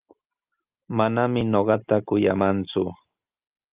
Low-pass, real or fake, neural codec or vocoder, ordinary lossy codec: 3.6 kHz; real; none; Opus, 24 kbps